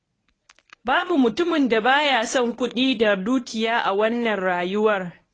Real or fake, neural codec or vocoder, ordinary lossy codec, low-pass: fake; codec, 24 kHz, 0.9 kbps, WavTokenizer, medium speech release version 1; AAC, 32 kbps; 9.9 kHz